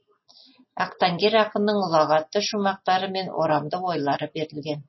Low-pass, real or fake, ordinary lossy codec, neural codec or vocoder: 7.2 kHz; real; MP3, 24 kbps; none